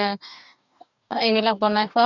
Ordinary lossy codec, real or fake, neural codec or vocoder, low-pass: none; fake; codec, 44.1 kHz, 2.6 kbps, DAC; 7.2 kHz